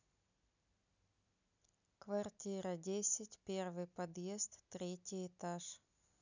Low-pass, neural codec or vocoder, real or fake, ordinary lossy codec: 7.2 kHz; none; real; none